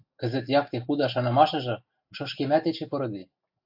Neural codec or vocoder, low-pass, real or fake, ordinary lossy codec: none; 5.4 kHz; real; AAC, 48 kbps